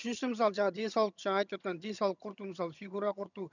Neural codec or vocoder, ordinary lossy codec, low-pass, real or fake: vocoder, 22.05 kHz, 80 mel bands, HiFi-GAN; none; 7.2 kHz; fake